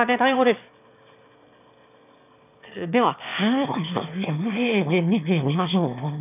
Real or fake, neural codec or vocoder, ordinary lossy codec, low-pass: fake; autoencoder, 22.05 kHz, a latent of 192 numbers a frame, VITS, trained on one speaker; none; 3.6 kHz